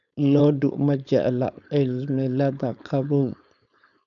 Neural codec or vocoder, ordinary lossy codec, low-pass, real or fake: codec, 16 kHz, 4.8 kbps, FACodec; none; 7.2 kHz; fake